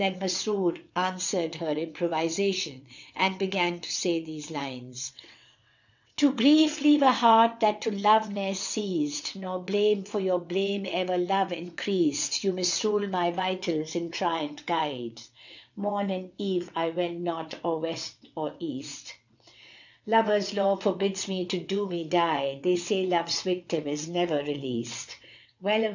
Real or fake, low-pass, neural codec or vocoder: fake; 7.2 kHz; vocoder, 22.05 kHz, 80 mel bands, WaveNeXt